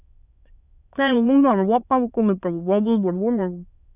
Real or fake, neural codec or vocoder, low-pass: fake; autoencoder, 22.05 kHz, a latent of 192 numbers a frame, VITS, trained on many speakers; 3.6 kHz